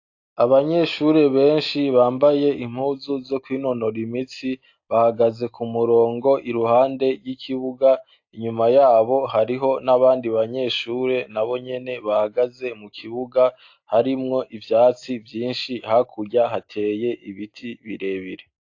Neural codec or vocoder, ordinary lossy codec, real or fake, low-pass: none; AAC, 48 kbps; real; 7.2 kHz